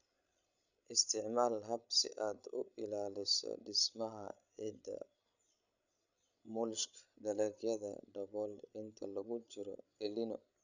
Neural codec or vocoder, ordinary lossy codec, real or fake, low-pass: codec, 16 kHz, 16 kbps, FreqCodec, larger model; none; fake; 7.2 kHz